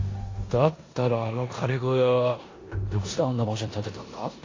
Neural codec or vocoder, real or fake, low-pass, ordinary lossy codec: codec, 16 kHz in and 24 kHz out, 0.9 kbps, LongCat-Audio-Codec, four codebook decoder; fake; 7.2 kHz; AAC, 32 kbps